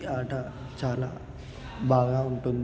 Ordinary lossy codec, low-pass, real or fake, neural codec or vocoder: none; none; real; none